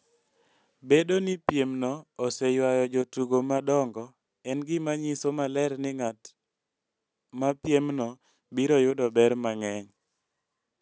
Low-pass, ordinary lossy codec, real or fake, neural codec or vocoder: none; none; real; none